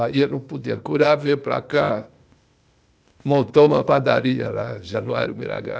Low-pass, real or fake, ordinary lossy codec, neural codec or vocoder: none; fake; none; codec, 16 kHz, 0.8 kbps, ZipCodec